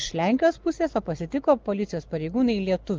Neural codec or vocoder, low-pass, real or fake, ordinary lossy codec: none; 7.2 kHz; real; Opus, 32 kbps